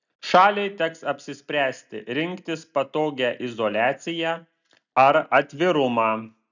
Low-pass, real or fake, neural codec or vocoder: 7.2 kHz; real; none